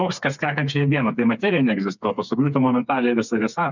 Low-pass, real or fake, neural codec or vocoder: 7.2 kHz; fake; codec, 16 kHz, 4 kbps, FreqCodec, smaller model